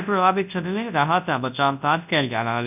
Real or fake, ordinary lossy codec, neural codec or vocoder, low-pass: fake; none; codec, 24 kHz, 0.9 kbps, WavTokenizer, large speech release; 3.6 kHz